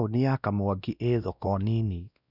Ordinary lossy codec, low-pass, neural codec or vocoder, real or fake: none; 5.4 kHz; codec, 16 kHz, 1 kbps, X-Codec, WavLM features, trained on Multilingual LibriSpeech; fake